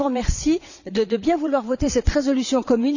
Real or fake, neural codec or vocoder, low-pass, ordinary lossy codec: fake; vocoder, 22.05 kHz, 80 mel bands, Vocos; 7.2 kHz; none